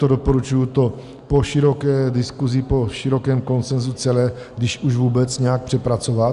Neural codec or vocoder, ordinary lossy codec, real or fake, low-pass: none; Opus, 64 kbps; real; 10.8 kHz